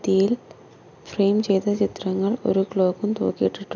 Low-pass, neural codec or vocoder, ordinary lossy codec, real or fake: 7.2 kHz; none; none; real